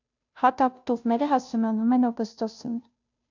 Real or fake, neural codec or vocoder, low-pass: fake; codec, 16 kHz, 0.5 kbps, FunCodec, trained on Chinese and English, 25 frames a second; 7.2 kHz